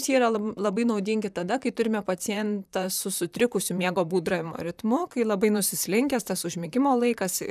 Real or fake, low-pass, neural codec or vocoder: fake; 14.4 kHz; vocoder, 44.1 kHz, 128 mel bands, Pupu-Vocoder